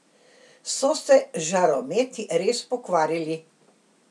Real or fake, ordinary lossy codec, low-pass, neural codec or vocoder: real; none; none; none